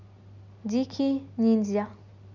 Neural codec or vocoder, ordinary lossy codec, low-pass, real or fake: none; none; 7.2 kHz; real